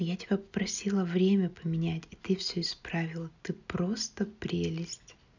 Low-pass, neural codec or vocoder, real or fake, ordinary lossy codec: 7.2 kHz; none; real; none